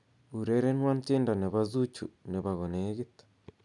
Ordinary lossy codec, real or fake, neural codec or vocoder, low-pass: none; real; none; 10.8 kHz